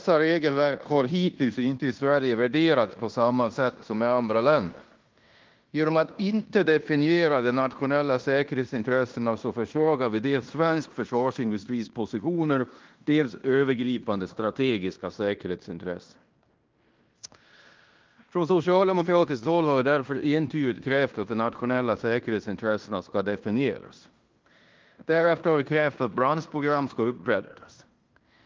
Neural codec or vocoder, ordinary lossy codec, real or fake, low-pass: codec, 16 kHz in and 24 kHz out, 0.9 kbps, LongCat-Audio-Codec, fine tuned four codebook decoder; Opus, 32 kbps; fake; 7.2 kHz